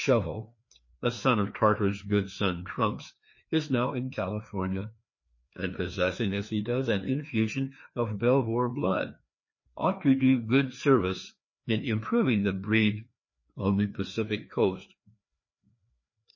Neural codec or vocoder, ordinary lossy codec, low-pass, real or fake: codec, 16 kHz, 2 kbps, FreqCodec, larger model; MP3, 32 kbps; 7.2 kHz; fake